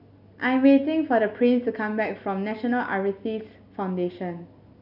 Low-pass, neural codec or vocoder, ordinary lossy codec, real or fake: 5.4 kHz; none; none; real